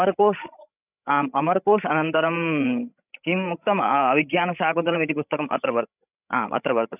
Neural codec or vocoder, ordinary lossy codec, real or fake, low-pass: codec, 16 kHz, 16 kbps, FreqCodec, larger model; none; fake; 3.6 kHz